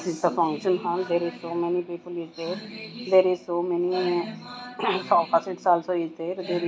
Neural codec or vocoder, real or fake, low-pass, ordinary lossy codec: none; real; none; none